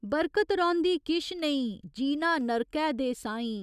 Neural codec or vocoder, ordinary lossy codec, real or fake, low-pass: none; none; real; 14.4 kHz